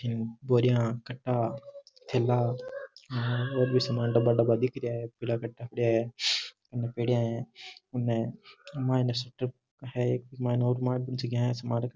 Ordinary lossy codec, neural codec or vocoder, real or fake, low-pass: none; none; real; none